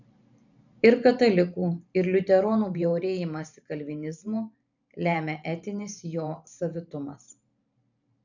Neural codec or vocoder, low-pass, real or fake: vocoder, 44.1 kHz, 128 mel bands every 512 samples, BigVGAN v2; 7.2 kHz; fake